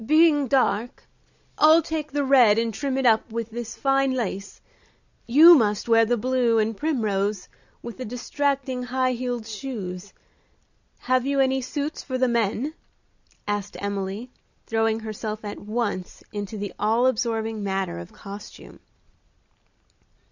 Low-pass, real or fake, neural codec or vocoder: 7.2 kHz; real; none